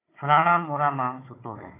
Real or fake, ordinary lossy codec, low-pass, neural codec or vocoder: fake; AAC, 16 kbps; 3.6 kHz; codec, 16 kHz, 4 kbps, FunCodec, trained on Chinese and English, 50 frames a second